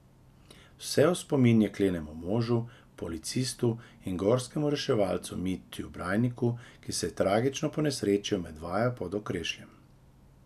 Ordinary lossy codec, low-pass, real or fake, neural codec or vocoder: none; 14.4 kHz; real; none